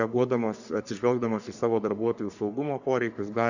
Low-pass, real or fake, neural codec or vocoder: 7.2 kHz; fake; codec, 44.1 kHz, 3.4 kbps, Pupu-Codec